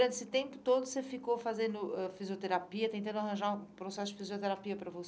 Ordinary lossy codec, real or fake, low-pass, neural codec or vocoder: none; real; none; none